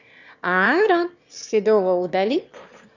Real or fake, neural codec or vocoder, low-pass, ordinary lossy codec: fake; autoencoder, 22.05 kHz, a latent of 192 numbers a frame, VITS, trained on one speaker; 7.2 kHz; none